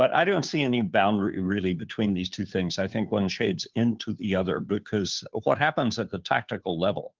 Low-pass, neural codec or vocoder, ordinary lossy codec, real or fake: 7.2 kHz; codec, 16 kHz, 2 kbps, FunCodec, trained on Chinese and English, 25 frames a second; Opus, 32 kbps; fake